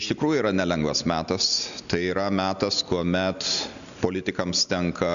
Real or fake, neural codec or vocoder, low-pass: real; none; 7.2 kHz